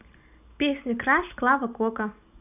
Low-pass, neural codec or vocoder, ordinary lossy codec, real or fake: 3.6 kHz; none; none; real